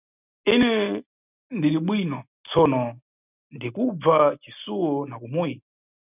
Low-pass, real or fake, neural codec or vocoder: 3.6 kHz; real; none